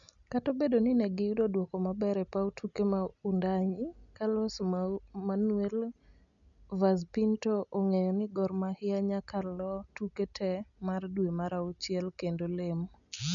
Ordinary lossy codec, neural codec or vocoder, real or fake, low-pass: none; none; real; 7.2 kHz